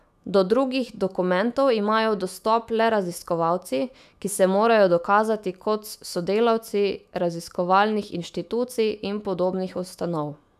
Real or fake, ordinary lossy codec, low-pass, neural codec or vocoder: fake; none; 14.4 kHz; autoencoder, 48 kHz, 128 numbers a frame, DAC-VAE, trained on Japanese speech